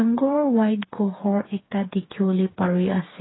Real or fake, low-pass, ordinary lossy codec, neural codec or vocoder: fake; 7.2 kHz; AAC, 16 kbps; codec, 16 kHz, 4 kbps, FreqCodec, smaller model